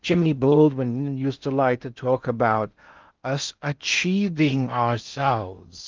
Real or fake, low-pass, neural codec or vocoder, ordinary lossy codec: fake; 7.2 kHz; codec, 16 kHz in and 24 kHz out, 0.6 kbps, FocalCodec, streaming, 4096 codes; Opus, 24 kbps